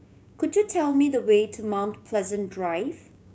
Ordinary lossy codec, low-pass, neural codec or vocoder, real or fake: none; none; codec, 16 kHz, 6 kbps, DAC; fake